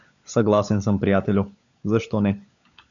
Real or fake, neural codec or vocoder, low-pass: fake; codec, 16 kHz, 16 kbps, FunCodec, trained on LibriTTS, 50 frames a second; 7.2 kHz